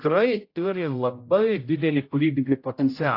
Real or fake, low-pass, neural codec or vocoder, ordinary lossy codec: fake; 5.4 kHz; codec, 16 kHz, 0.5 kbps, X-Codec, HuBERT features, trained on general audio; AAC, 32 kbps